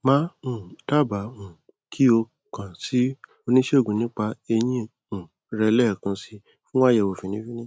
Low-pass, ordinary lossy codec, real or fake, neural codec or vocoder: none; none; real; none